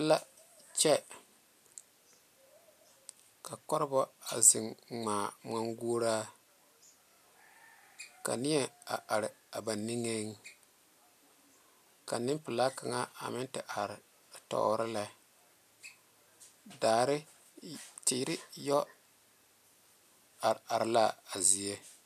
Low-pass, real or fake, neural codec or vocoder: 14.4 kHz; real; none